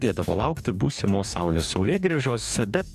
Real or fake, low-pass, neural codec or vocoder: fake; 14.4 kHz; codec, 44.1 kHz, 2.6 kbps, DAC